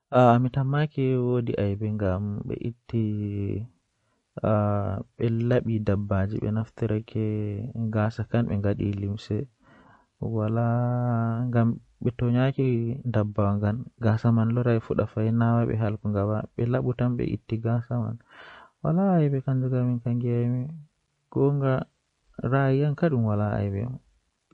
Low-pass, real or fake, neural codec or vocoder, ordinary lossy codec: 19.8 kHz; real; none; MP3, 48 kbps